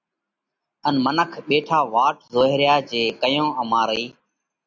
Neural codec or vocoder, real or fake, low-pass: none; real; 7.2 kHz